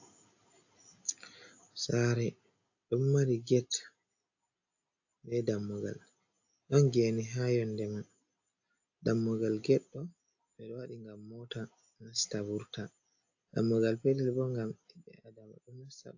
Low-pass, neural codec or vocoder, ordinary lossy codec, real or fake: 7.2 kHz; none; AAC, 48 kbps; real